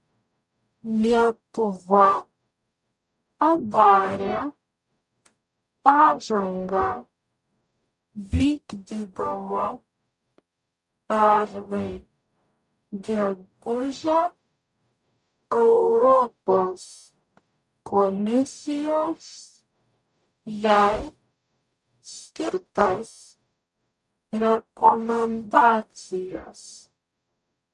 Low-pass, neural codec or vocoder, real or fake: 10.8 kHz; codec, 44.1 kHz, 0.9 kbps, DAC; fake